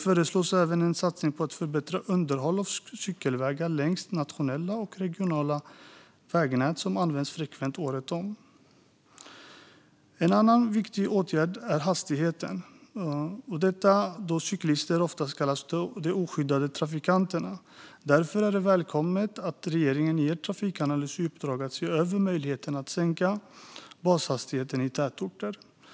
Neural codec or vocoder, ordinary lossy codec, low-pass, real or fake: none; none; none; real